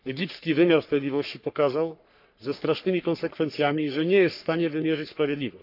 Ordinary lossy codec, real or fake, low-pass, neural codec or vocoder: none; fake; 5.4 kHz; codec, 44.1 kHz, 3.4 kbps, Pupu-Codec